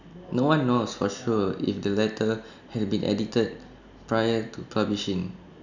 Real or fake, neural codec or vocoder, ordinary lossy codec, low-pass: real; none; none; 7.2 kHz